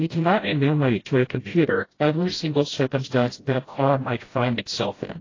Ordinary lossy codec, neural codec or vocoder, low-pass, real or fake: AAC, 32 kbps; codec, 16 kHz, 0.5 kbps, FreqCodec, smaller model; 7.2 kHz; fake